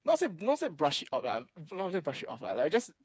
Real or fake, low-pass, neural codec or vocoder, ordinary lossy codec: fake; none; codec, 16 kHz, 4 kbps, FreqCodec, smaller model; none